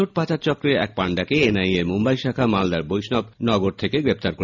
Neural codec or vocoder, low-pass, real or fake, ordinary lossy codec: none; 7.2 kHz; real; none